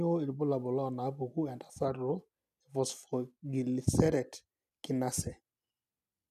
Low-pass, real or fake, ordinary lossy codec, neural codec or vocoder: 14.4 kHz; real; none; none